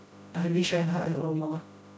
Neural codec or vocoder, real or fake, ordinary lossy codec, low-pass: codec, 16 kHz, 0.5 kbps, FreqCodec, smaller model; fake; none; none